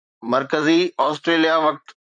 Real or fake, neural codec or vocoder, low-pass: fake; vocoder, 44.1 kHz, 128 mel bands, Pupu-Vocoder; 9.9 kHz